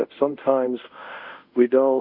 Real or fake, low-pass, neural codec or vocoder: fake; 5.4 kHz; codec, 24 kHz, 0.5 kbps, DualCodec